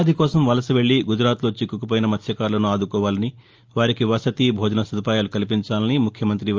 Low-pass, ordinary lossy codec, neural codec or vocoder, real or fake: 7.2 kHz; Opus, 24 kbps; none; real